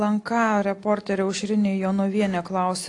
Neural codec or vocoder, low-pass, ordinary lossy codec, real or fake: none; 10.8 kHz; AAC, 48 kbps; real